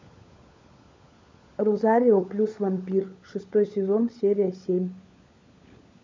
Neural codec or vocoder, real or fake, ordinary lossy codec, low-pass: codec, 16 kHz, 16 kbps, FunCodec, trained on LibriTTS, 50 frames a second; fake; MP3, 64 kbps; 7.2 kHz